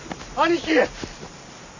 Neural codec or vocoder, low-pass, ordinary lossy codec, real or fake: none; 7.2 kHz; none; real